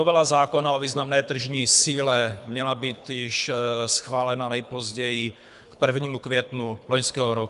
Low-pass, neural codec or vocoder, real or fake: 10.8 kHz; codec, 24 kHz, 3 kbps, HILCodec; fake